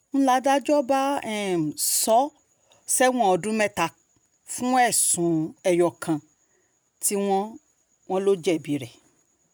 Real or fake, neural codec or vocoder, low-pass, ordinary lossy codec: real; none; none; none